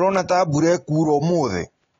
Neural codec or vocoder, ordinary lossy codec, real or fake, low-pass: none; AAC, 32 kbps; real; 7.2 kHz